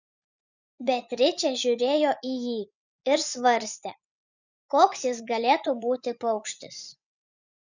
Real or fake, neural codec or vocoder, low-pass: fake; vocoder, 24 kHz, 100 mel bands, Vocos; 7.2 kHz